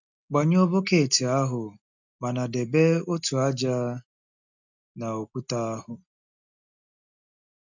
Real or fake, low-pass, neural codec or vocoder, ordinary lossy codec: real; 7.2 kHz; none; none